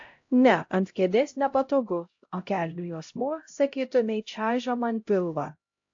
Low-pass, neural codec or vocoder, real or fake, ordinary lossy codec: 7.2 kHz; codec, 16 kHz, 0.5 kbps, X-Codec, HuBERT features, trained on LibriSpeech; fake; AAC, 48 kbps